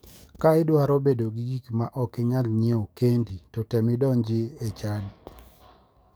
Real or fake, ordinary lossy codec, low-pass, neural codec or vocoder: fake; none; none; codec, 44.1 kHz, 7.8 kbps, DAC